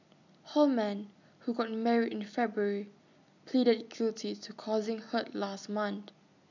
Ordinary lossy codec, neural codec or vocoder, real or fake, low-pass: none; none; real; 7.2 kHz